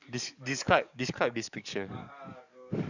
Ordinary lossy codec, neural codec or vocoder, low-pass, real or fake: none; codec, 44.1 kHz, 7.8 kbps, DAC; 7.2 kHz; fake